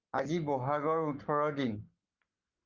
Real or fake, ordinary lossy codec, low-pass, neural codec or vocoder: fake; Opus, 32 kbps; 7.2 kHz; codec, 44.1 kHz, 7.8 kbps, Pupu-Codec